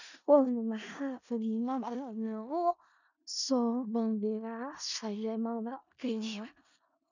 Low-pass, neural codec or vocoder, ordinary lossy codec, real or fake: 7.2 kHz; codec, 16 kHz in and 24 kHz out, 0.4 kbps, LongCat-Audio-Codec, four codebook decoder; none; fake